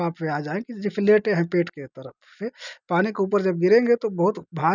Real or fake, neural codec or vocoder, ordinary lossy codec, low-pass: real; none; none; 7.2 kHz